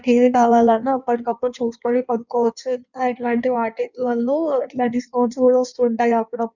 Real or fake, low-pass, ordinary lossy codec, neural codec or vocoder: fake; 7.2 kHz; none; codec, 16 kHz in and 24 kHz out, 1.1 kbps, FireRedTTS-2 codec